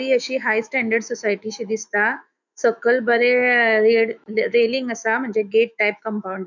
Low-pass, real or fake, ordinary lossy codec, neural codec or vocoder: 7.2 kHz; fake; none; autoencoder, 48 kHz, 128 numbers a frame, DAC-VAE, trained on Japanese speech